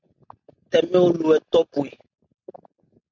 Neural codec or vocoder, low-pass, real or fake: none; 7.2 kHz; real